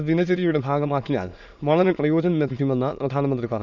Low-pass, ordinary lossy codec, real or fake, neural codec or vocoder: 7.2 kHz; none; fake; autoencoder, 22.05 kHz, a latent of 192 numbers a frame, VITS, trained on many speakers